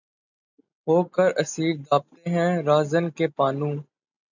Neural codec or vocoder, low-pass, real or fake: none; 7.2 kHz; real